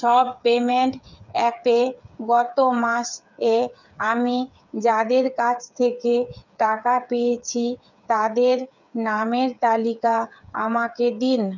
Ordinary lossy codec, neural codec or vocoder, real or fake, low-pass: none; codec, 16 kHz, 8 kbps, FreqCodec, smaller model; fake; 7.2 kHz